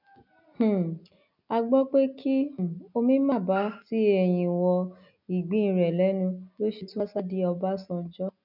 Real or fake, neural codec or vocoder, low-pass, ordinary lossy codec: real; none; 5.4 kHz; none